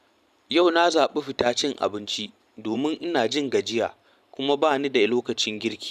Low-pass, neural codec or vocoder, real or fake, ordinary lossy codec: 14.4 kHz; vocoder, 44.1 kHz, 128 mel bands every 512 samples, BigVGAN v2; fake; none